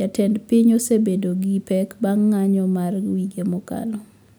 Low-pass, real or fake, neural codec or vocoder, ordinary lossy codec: none; real; none; none